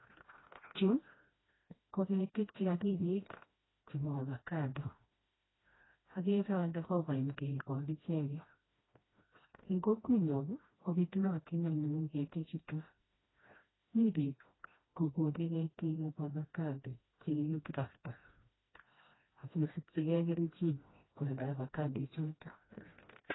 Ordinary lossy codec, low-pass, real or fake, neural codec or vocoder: AAC, 16 kbps; 7.2 kHz; fake; codec, 16 kHz, 1 kbps, FreqCodec, smaller model